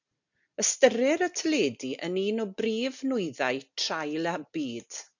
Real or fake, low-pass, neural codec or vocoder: real; 7.2 kHz; none